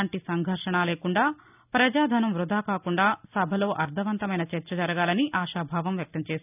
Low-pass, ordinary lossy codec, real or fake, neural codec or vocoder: 3.6 kHz; none; real; none